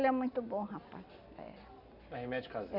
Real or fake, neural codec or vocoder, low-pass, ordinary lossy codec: real; none; 5.4 kHz; none